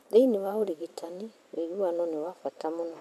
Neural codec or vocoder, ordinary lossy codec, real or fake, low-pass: vocoder, 44.1 kHz, 128 mel bands every 512 samples, BigVGAN v2; MP3, 96 kbps; fake; 14.4 kHz